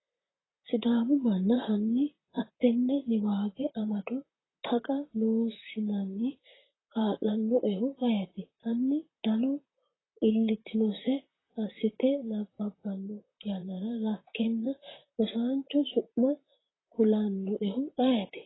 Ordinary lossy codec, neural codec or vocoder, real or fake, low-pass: AAC, 16 kbps; vocoder, 44.1 kHz, 128 mel bands, Pupu-Vocoder; fake; 7.2 kHz